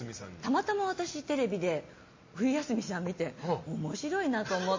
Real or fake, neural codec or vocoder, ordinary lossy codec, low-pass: real; none; MP3, 32 kbps; 7.2 kHz